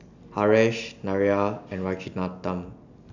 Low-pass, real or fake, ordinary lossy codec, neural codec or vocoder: 7.2 kHz; real; none; none